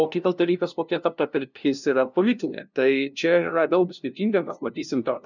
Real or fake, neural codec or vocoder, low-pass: fake; codec, 16 kHz, 0.5 kbps, FunCodec, trained on LibriTTS, 25 frames a second; 7.2 kHz